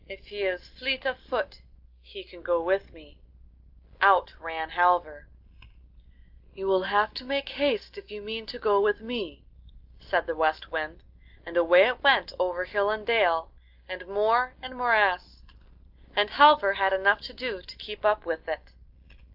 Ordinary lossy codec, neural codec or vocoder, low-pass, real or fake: Opus, 32 kbps; none; 5.4 kHz; real